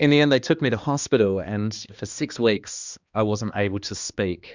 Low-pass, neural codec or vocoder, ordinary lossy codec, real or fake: 7.2 kHz; codec, 16 kHz, 2 kbps, X-Codec, HuBERT features, trained on balanced general audio; Opus, 64 kbps; fake